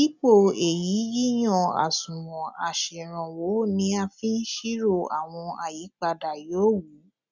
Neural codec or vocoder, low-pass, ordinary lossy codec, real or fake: none; 7.2 kHz; none; real